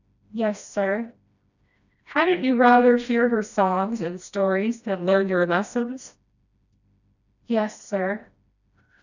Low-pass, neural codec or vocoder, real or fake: 7.2 kHz; codec, 16 kHz, 1 kbps, FreqCodec, smaller model; fake